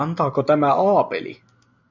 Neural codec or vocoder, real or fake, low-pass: none; real; 7.2 kHz